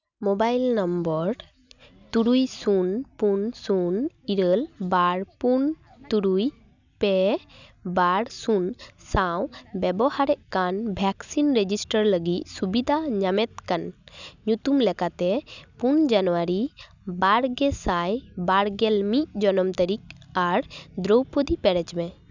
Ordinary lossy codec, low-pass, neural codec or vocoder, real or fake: none; 7.2 kHz; none; real